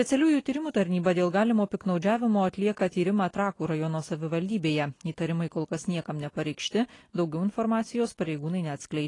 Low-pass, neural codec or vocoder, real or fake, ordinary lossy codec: 10.8 kHz; none; real; AAC, 32 kbps